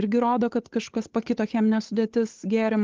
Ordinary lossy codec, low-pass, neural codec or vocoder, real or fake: Opus, 16 kbps; 7.2 kHz; codec, 16 kHz, 8 kbps, FunCodec, trained on Chinese and English, 25 frames a second; fake